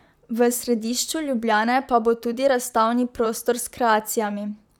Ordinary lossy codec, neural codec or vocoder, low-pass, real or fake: none; vocoder, 44.1 kHz, 128 mel bands, Pupu-Vocoder; 19.8 kHz; fake